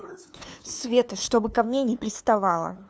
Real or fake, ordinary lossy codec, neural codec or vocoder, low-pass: fake; none; codec, 16 kHz, 2 kbps, FunCodec, trained on LibriTTS, 25 frames a second; none